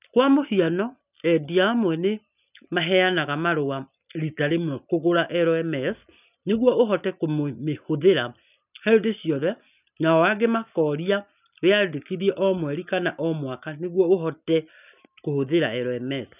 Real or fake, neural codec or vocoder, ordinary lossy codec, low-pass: real; none; none; 3.6 kHz